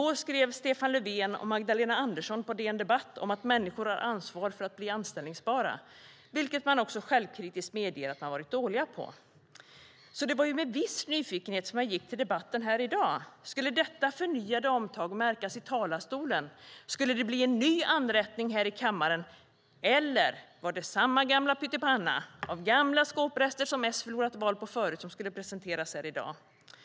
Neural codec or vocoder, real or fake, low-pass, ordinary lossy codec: none; real; none; none